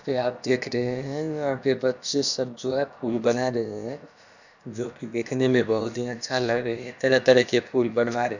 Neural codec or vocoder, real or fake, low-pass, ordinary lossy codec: codec, 16 kHz, about 1 kbps, DyCAST, with the encoder's durations; fake; 7.2 kHz; none